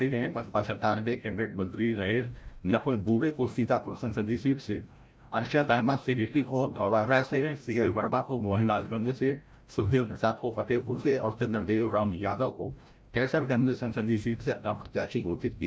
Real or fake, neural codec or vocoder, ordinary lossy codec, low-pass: fake; codec, 16 kHz, 0.5 kbps, FreqCodec, larger model; none; none